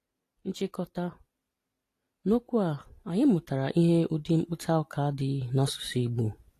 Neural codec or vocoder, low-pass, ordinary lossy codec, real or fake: none; 14.4 kHz; AAC, 48 kbps; real